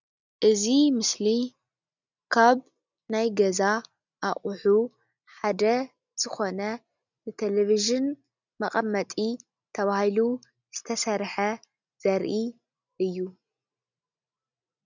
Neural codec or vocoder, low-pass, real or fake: none; 7.2 kHz; real